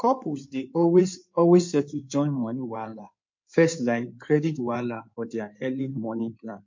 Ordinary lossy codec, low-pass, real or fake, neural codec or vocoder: MP3, 48 kbps; 7.2 kHz; fake; codec, 16 kHz in and 24 kHz out, 2.2 kbps, FireRedTTS-2 codec